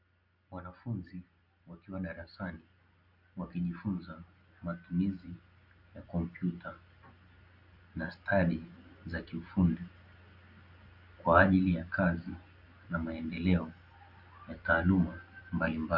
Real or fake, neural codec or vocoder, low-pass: real; none; 5.4 kHz